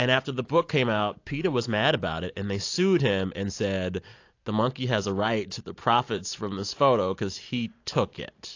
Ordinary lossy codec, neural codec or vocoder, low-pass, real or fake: AAC, 48 kbps; none; 7.2 kHz; real